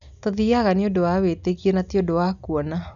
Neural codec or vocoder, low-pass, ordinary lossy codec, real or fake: none; 7.2 kHz; none; real